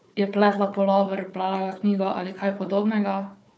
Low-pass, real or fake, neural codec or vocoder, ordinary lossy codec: none; fake; codec, 16 kHz, 4 kbps, FunCodec, trained on Chinese and English, 50 frames a second; none